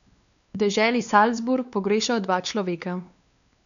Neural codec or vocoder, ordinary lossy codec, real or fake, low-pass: codec, 16 kHz, 2 kbps, X-Codec, WavLM features, trained on Multilingual LibriSpeech; none; fake; 7.2 kHz